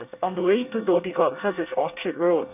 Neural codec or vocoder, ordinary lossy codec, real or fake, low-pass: codec, 24 kHz, 1 kbps, SNAC; none; fake; 3.6 kHz